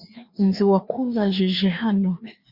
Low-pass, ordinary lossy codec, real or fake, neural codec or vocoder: 5.4 kHz; Opus, 64 kbps; fake; codec, 16 kHz, 2 kbps, FreqCodec, larger model